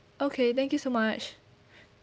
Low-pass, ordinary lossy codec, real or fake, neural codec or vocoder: none; none; real; none